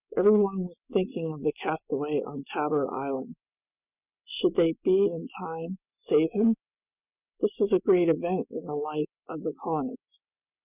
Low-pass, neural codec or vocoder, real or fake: 3.6 kHz; none; real